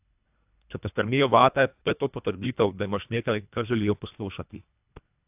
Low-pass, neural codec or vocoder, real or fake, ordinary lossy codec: 3.6 kHz; codec, 24 kHz, 1.5 kbps, HILCodec; fake; AAC, 32 kbps